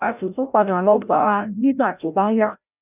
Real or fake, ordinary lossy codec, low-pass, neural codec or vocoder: fake; none; 3.6 kHz; codec, 16 kHz, 0.5 kbps, FreqCodec, larger model